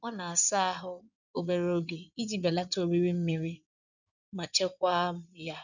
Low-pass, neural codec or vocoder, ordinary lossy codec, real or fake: 7.2 kHz; codec, 16 kHz in and 24 kHz out, 2.2 kbps, FireRedTTS-2 codec; none; fake